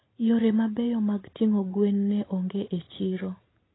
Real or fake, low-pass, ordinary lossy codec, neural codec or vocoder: real; 7.2 kHz; AAC, 16 kbps; none